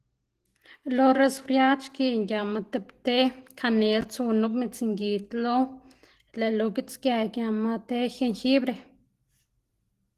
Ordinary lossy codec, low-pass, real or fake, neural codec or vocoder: Opus, 16 kbps; 14.4 kHz; real; none